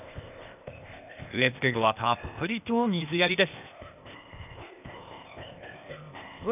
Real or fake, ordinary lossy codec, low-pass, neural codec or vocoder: fake; none; 3.6 kHz; codec, 16 kHz, 0.8 kbps, ZipCodec